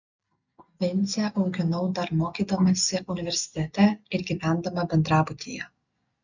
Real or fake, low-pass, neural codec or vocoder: real; 7.2 kHz; none